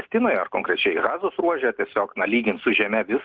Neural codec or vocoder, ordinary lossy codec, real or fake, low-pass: none; Opus, 16 kbps; real; 7.2 kHz